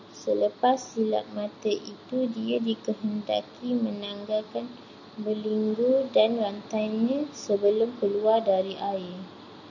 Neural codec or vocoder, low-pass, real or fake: none; 7.2 kHz; real